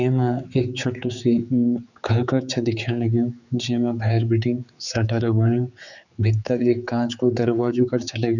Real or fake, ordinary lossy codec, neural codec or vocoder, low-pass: fake; none; codec, 16 kHz, 4 kbps, X-Codec, HuBERT features, trained on general audio; 7.2 kHz